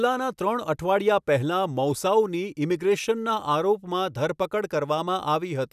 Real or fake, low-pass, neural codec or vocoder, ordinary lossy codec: real; 14.4 kHz; none; none